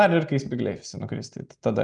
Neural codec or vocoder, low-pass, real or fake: none; 9.9 kHz; real